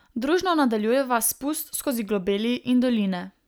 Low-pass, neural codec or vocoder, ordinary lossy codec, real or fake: none; none; none; real